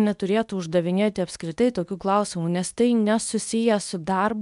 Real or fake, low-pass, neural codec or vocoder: fake; 10.8 kHz; codec, 24 kHz, 0.9 kbps, WavTokenizer, medium speech release version 2